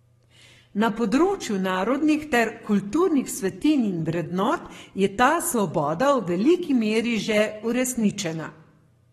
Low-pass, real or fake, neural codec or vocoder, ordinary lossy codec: 19.8 kHz; fake; codec, 44.1 kHz, 7.8 kbps, Pupu-Codec; AAC, 32 kbps